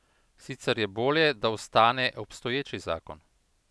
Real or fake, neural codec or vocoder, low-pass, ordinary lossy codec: real; none; none; none